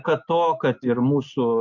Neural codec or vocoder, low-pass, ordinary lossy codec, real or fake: none; 7.2 kHz; MP3, 48 kbps; real